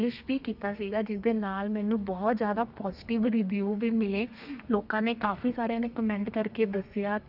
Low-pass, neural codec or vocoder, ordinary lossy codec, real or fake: 5.4 kHz; codec, 32 kHz, 1.9 kbps, SNAC; none; fake